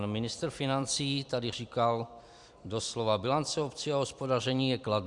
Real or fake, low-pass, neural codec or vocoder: fake; 10.8 kHz; vocoder, 44.1 kHz, 128 mel bands every 256 samples, BigVGAN v2